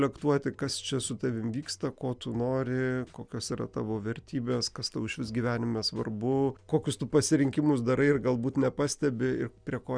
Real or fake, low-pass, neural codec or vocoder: real; 9.9 kHz; none